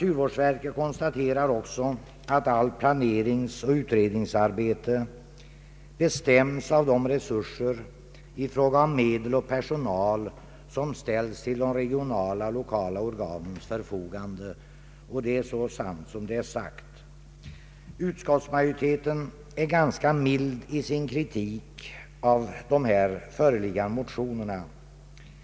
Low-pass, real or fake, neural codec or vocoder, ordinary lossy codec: none; real; none; none